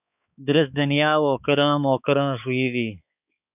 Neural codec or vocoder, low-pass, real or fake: codec, 16 kHz, 4 kbps, X-Codec, HuBERT features, trained on balanced general audio; 3.6 kHz; fake